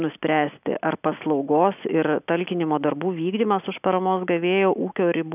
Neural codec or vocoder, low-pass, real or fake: none; 3.6 kHz; real